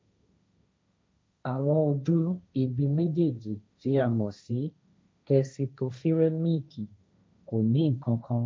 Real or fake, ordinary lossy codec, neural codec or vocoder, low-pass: fake; none; codec, 16 kHz, 1.1 kbps, Voila-Tokenizer; none